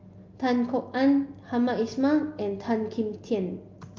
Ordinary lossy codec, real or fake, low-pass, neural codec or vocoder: Opus, 24 kbps; real; 7.2 kHz; none